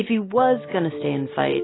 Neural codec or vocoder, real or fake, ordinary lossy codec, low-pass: none; real; AAC, 16 kbps; 7.2 kHz